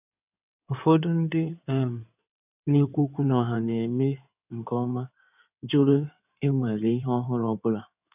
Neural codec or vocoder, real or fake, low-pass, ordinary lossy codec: codec, 16 kHz in and 24 kHz out, 2.2 kbps, FireRedTTS-2 codec; fake; 3.6 kHz; none